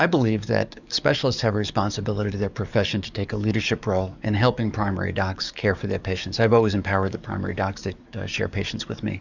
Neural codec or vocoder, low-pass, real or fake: codec, 44.1 kHz, 7.8 kbps, DAC; 7.2 kHz; fake